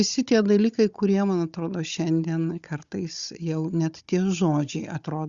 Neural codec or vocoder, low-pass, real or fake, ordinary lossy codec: codec, 16 kHz, 16 kbps, FunCodec, trained on Chinese and English, 50 frames a second; 7.2 kHz; fake; Opus, 64 kbps